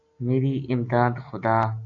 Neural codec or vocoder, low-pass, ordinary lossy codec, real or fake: none; 7.2 kHz; AAC, 48 kbps; real